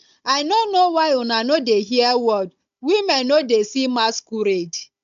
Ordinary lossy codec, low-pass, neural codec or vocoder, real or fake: MP3, 64 kbps; 7.2 kHz; codec, 16 kHz, 16 kbps, FunCodec, trained on Chinese and English, 50 frames a second; fake